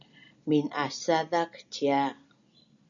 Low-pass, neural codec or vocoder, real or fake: 7.2 kHz; none; real